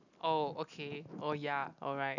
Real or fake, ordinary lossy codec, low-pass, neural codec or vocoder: real; none; 7.2 kHz; none